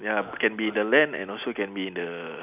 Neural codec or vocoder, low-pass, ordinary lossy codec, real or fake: none; 3.6 kHz; none; real